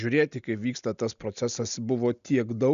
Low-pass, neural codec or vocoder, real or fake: 7.2 kHz; none; real